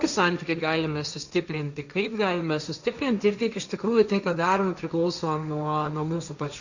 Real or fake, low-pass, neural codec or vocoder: fake; 7.2 kHz; codec, 16 kHz, 1.1 kbps, Voila-Tokenizer